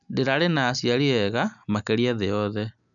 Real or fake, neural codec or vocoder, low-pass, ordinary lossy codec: real; none; 7.2 kHz; none